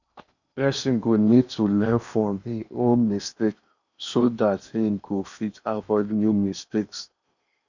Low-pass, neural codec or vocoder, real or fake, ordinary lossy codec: 7.2 kHz; codec, 16 kHz in and 24 kHz out, 0.8 kbps, FocalCodec, streaming, 65536 codes; fake; none